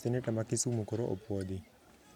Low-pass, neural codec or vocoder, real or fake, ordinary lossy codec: 19.8 kHz; none; real; none